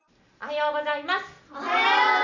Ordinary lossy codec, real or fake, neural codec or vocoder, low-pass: none; real; none; 7.2 kHz